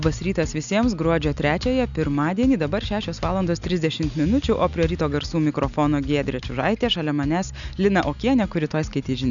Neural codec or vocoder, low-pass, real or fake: none; 7.2 kHz; real